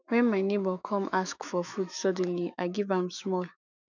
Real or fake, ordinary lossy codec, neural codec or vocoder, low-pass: fake; none; autoencoder, 48 kHz, 128 numbers a frame, DAC-VAE, trained on Japanese speech; 7.2 kHz